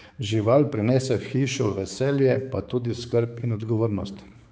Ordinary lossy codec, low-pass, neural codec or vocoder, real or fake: none; none; codec, 16 kHz, 4 kbps, X-Codec, HuBERT features, trained on balanced general audio; fake